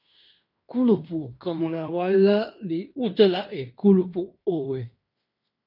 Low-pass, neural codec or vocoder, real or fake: 5.4 kHz; codec, 16 kHz in and 24 kHz out, 0.9 kbps, LongCat-Audio-Codec, fine tuned four codebook decoder; fake